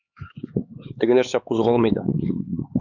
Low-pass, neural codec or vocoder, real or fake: 7.2 kHz; codec, 16 kHz, 4 kbps, X-Codec, HuBERT features, trained on LibriSpeech; fake